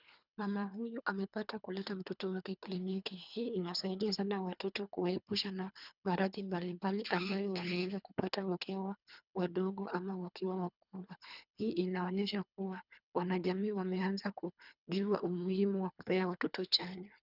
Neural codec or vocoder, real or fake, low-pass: codec, 24 kHz, 3 kbps, HILCodec; fake; 5.4 kHz